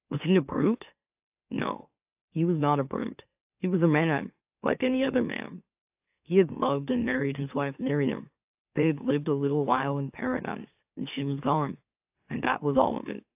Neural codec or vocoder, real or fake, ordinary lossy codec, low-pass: autoencoder, 44.1 kHz, a latent of 192 numbers a frame, MeloTTS; fake; AAC, 32 kbps; 3.6 kHz